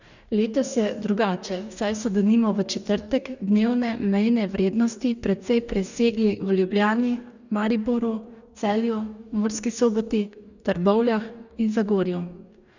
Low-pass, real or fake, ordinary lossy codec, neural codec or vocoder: 7.2 kHz; fake; none; codec, 44.1 kHz, 2.6 kbps, DAC